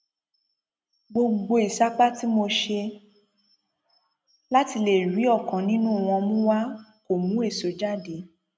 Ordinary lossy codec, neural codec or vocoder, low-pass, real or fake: none; none; none; real